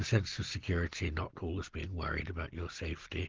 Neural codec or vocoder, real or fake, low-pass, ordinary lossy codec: none; real; 7.2 kHz; Opus, 16 kbps